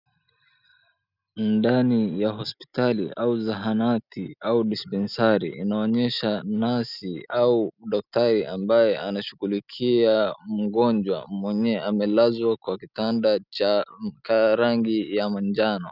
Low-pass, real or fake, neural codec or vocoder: 5.4 kHz; real; none